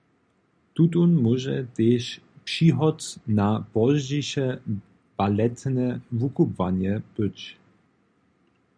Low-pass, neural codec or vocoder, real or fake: 9.9 kHz; none; real